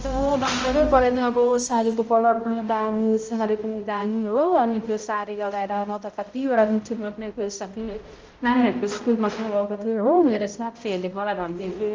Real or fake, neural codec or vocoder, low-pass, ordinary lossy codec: fake; codec, 16 kHz, 0.5 kbps, X-Codec, HuBERT features, trained on balanced general audio; 7.2 kHz; Opus, 24 kbps